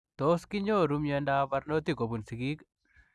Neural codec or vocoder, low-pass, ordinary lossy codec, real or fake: none; none; none; real